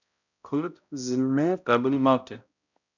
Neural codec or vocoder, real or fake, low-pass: codec, 16 kHz, 0.5 kbps, X-Codec, HuBERT features, trained on balanced general audio; fake; 7.2 kHz